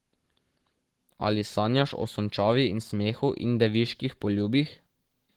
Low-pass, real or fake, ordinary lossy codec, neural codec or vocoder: 19.8 kHz; fake; Opus, 16 kbps; codec, 44.1 kHz, 7.8 kbps, Pupu-Codec